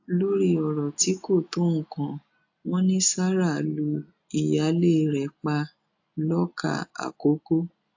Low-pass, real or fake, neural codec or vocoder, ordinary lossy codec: 7.2 kHz; real; none; AAC, 48 kbps